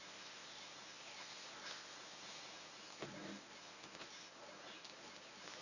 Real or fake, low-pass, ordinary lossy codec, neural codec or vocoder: fake; 7.2 kHz; none; codec, 24 kHz, 0.9 kbps, WavTokenizer, medium speech release version 1